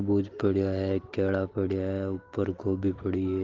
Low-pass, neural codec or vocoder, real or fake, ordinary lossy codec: 7.2 kHz; none; real; Opus, 16 kbps